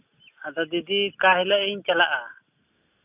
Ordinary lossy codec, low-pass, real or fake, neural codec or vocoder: none; 3.6 kHz; real; none